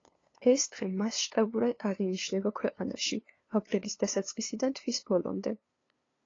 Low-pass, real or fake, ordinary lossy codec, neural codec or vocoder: 7.2 kHz; fake; AAC, 32 kbps; codec, 16 kHz, 2 kbps, FunCodec, trained on LibriTTS, 25 frames a second